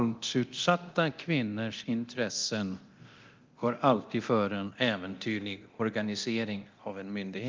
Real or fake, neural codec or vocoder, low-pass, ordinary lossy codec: fake; codec, 24 kHz, 0.9 kbps, DualCodec; 7.2 kHz; Opus, 32 kbps